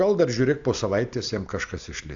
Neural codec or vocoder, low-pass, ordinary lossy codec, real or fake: none; 7.2 kHz; AAC, 64 kbps; real